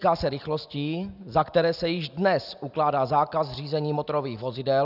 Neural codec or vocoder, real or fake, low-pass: none; real; 5.4 kHz